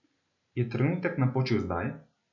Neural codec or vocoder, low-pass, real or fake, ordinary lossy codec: none; 7.2 kHz; real; none